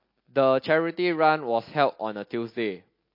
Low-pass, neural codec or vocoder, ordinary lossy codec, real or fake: 5.4 kHz; none; MP3, 32 kbps; real